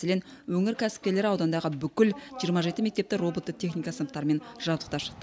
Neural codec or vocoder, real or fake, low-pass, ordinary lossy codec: none; real; none; none